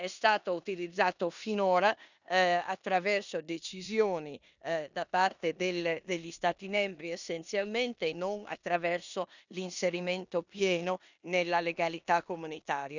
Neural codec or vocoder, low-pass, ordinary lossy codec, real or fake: codec, 16 kHz in and 24 kHz out, 0.9 kbps, LongCat-Audio-Codec, four codebook decoder; 7.2 kHz; none; fake